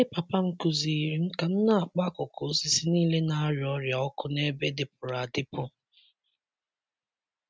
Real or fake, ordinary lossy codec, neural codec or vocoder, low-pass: real; none; none; none